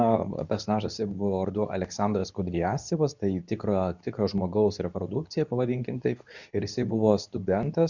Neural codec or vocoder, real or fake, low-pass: codec, 24 kHz, 0.9 kbps, WavTokenizer, medium speech release version 2; fake; 7.2 kHz